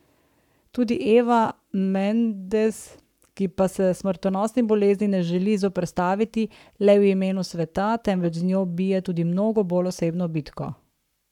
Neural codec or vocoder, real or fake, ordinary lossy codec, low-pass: codec, 44.1 kHz, 7.8 kbps, Pupu-Codec; fake; none; 19.8 kHz